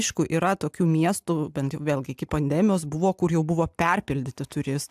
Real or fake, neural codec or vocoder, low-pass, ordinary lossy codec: real; none; 14.4 kHz; AAC, 96 kbps